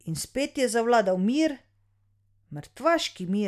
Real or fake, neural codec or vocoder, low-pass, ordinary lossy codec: real; none; 14.4 kHz; MP3, 96 kbps